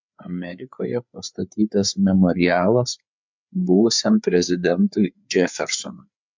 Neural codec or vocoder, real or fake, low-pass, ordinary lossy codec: codec, 16 kHz, 4 kbps, FreqCodec, larger model; fake; 7.2 kHz; MP3, 64 kbps